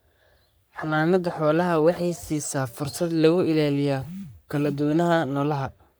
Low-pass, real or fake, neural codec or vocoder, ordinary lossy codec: none; fake; codec, 44.1 kHz, 3.4 kbps, Pupu-Codec; none